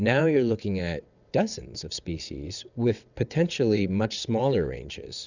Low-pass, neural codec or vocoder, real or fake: 7.2 kHz; vocoder, 22.05 kHz, 80 mel bands, WaveNeXt; fake